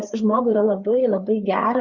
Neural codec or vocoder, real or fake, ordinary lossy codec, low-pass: codec, 16 kHz, 4 kbps, FreqCodec, larger model; fake; Opus, 64 kbps; 7.2 kHz